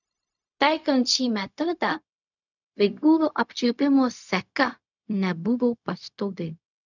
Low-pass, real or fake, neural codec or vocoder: 7.2 kHz; fake; codec, 16 kHz, 0.4 kbps, LongCat-Audio-Codec